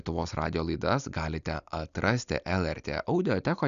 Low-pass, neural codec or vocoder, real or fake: 7.2 kHz; none; real